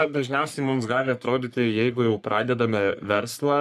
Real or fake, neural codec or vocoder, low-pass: fake; codec, 44.1 kHz, 3.4 kbps, Pupu-Codec; 14.4 kHz